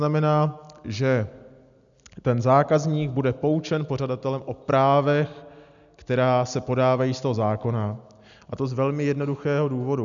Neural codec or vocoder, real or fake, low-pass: none; real; 7.2 kHz